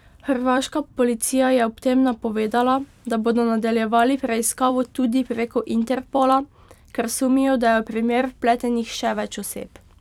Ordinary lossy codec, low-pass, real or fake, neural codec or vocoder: none; 19.8 kHz; fake; vocoder, 44.1 kHz, 128 mel bands every 256 samples, BigVGAN v2